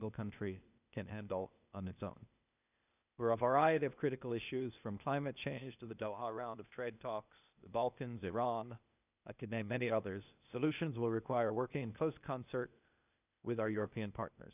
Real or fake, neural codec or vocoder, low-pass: fake; codec, 16 kHz, 0.8 kbps, ZipCodec; 3.6 kHz